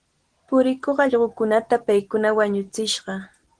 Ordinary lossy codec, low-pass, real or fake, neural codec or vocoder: Opus, 16 kbps; 9.9 kHz; real; none